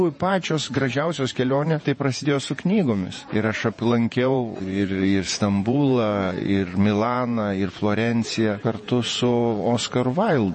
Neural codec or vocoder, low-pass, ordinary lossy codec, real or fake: vocoder, 48 kHz, 128 mel bands, Vocos; 10.8 kHz; MP3, 32 kbps; fake